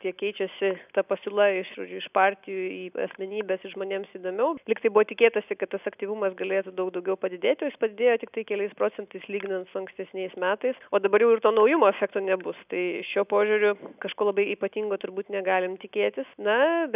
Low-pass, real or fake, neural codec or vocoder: 3.6 kHz; real; none